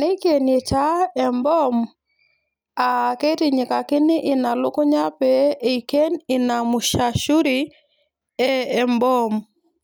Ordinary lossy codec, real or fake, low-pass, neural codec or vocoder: none; real; none; none